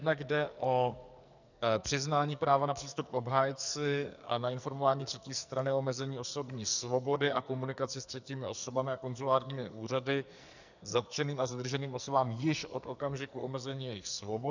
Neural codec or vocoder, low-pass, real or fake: codec, 44.1 kHz, 2.6 kbps, SNAC; 7.2 kHz; fake